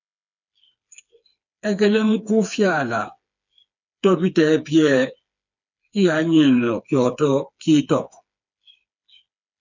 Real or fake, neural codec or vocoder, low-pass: fake; codec, 16 kHz, 4 kbps, FreqCodec, smaller model; 7.2 kHz